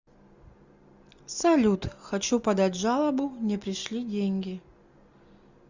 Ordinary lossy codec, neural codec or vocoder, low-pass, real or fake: Opus, 64 kbps; none; 7.2 kHz; real